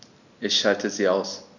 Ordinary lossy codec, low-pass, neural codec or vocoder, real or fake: none; 7.2 kHz; none; real